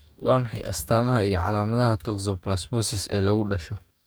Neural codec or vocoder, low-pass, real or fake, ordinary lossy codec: codec, 44.1 kHz, 2.6 kbps, DAC; none; fake; none